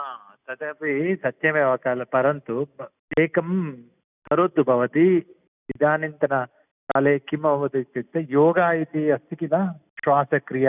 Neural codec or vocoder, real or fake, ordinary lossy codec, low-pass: none; real; none; 3.6 kHz